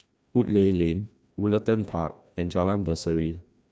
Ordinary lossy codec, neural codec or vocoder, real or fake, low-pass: none; codec, 16 kHz, 1 kbps, FreqCodec, larger model; fake; none